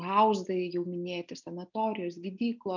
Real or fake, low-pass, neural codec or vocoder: real; 7.2 kHz; none